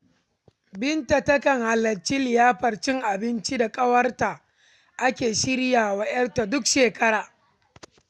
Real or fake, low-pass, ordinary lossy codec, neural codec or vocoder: real; none; none; none